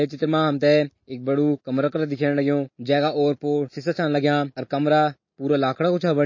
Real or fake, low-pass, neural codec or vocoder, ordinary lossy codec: real; 7.2 kHz; none; MP3, 32 kbps